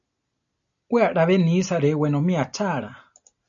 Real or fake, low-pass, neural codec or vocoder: real; 7.2 kHz; none